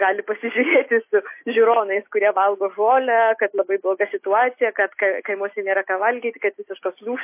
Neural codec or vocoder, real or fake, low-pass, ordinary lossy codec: none; real; 3.6 kHz; MP3, 24 kbps